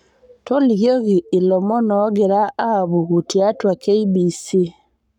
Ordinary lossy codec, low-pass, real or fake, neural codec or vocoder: none; 19.8 kHz; fake; codec, 44.1 kHz, 7.8 kbps, Pupu-Codec